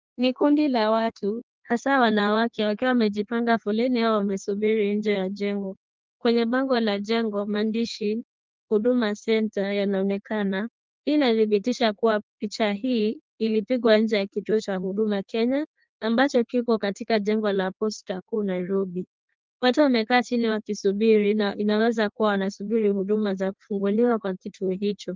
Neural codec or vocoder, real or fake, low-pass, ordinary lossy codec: codec, 16 kHz in and 24 kHz out, 1.1 kbps, FireRedTTS-2 codec; fake; 7.2 kHz; Opus, 32 kbps